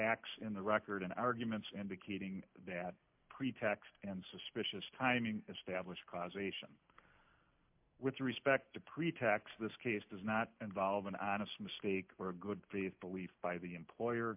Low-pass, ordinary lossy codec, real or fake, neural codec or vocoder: 3.6 kHz; MP3, 32 kbps; real; none